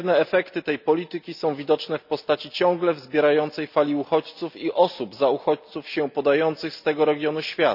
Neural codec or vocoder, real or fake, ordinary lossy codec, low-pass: none; real; none; 5.4 kHz